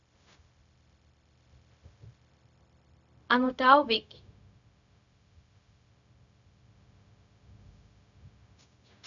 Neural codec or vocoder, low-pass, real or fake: codec, 16 kHz, 0.4 kbps, LongCat-Audio-Codec; 7.2 kHz; fake